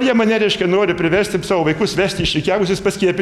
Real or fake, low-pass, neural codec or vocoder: real; 19.8 kHz; none